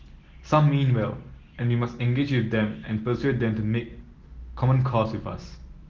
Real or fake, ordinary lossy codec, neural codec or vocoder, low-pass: real; Opus, 16 kbps; none; 7.2 kHz